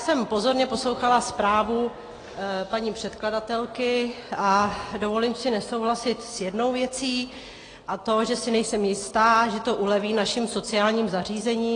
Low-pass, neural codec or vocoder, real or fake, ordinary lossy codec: 9.9 kHz; none; real; AAC, 32 kbps